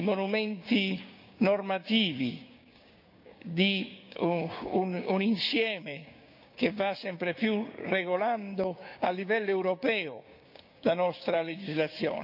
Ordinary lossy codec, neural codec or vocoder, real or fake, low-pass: none; codec, 16 kHz, 6 kbps, DAC; fake; 5.4 kHz